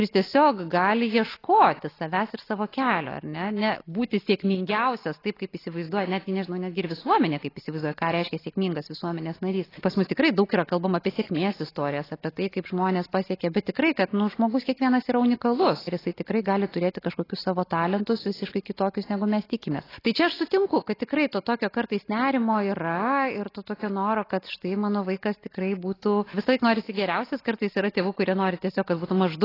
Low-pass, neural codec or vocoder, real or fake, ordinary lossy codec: 5.4 kHz; none; real; AAC, 24 kbps